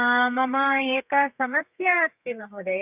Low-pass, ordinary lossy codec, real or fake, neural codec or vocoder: 3.6 kHz; none; fake; codec, 32 kHz, 1.9 kbps, SNAC